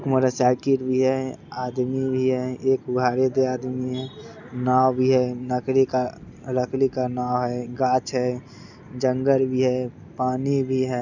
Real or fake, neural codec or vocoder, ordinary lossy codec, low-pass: real; none; none; 7.2 kHz